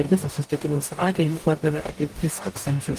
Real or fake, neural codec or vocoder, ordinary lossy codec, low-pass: fake; codec, 44.1 kHz, 0.9 kbps, DAC; Opus, 24 kbps; 14.4 kHz